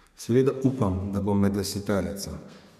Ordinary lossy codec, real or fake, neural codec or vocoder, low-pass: none; fake; codec, 32 kHz, 1.9 kbps, SNAC; 14.4 kHz